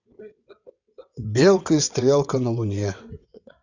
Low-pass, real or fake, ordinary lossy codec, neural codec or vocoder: 7.2 kHz; fake; none; vocoder, 22.05 kHz, 80 mel bands, WaveNeXt